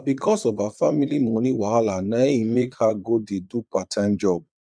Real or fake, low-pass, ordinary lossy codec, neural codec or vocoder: fake; 9.9 kHz; none; vocoder, 22.05 kHz, 80 mel bands, Vocos